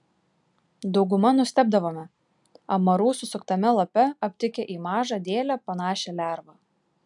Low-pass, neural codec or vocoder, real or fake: 10.8 kHz; none; real